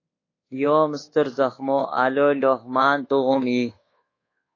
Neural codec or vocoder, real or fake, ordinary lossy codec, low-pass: codec, 24 kHz, 1.2 kbps, DualCodec; fake; AAC, 32 kbps; 7.2 kHz